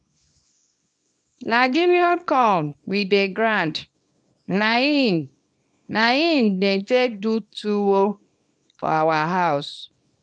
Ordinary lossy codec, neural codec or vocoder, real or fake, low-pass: none; codec, 24 kHz, 0.9 kbps, WavTokenizer, small release; fake; 9.9 kHz